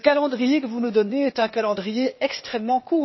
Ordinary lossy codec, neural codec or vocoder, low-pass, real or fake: MP3, 24 kbps; codec, 16 kHz, 0.8 kbps, ZipCodec; 7.2 kHz; fake